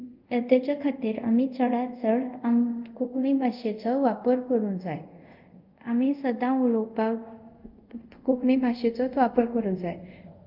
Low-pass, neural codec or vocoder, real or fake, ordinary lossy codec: 5.4 kHz; codec, 24 kHz, 0.5 kbps, DualCodec; fake; Opus, 24 kbps